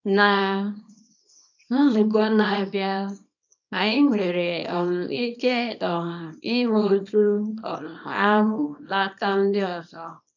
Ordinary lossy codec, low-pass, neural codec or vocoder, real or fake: none; 7.2 kHz; codec, 24 kHz, 0.9 kbps, WavTokenizer, small release; fake